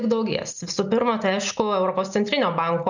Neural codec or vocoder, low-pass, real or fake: none; 7.2 kHz; real